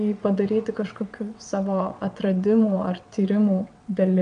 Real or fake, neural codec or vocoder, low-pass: fake; vocoder, 22.05 kHz, 80 mel bands, WaveNeXt; 9.9 kHz